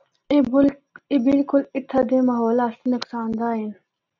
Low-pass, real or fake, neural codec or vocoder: 7.2 kHz; real; none